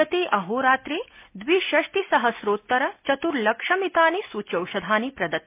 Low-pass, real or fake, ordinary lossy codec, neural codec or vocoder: 3.6 kHz; real; MP3, 24 kbps; none